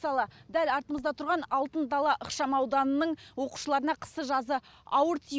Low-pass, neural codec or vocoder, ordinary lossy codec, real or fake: none; none; none; real